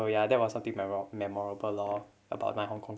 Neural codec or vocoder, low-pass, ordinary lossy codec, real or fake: none; none; none; real